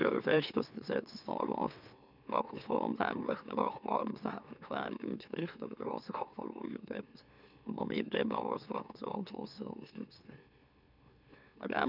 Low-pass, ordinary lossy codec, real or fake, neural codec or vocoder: 5.4 kHz; none; fake; autoencoder, 44.1 kHz, a latent of 192 numbers a frame, MeloTTS